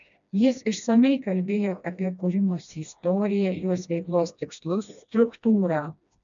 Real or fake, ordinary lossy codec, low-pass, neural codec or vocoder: fake; MP3, 96 kbps; 7.2 kHz; codec, 16 kHz, 1 kbps, FreqCodec, smaller model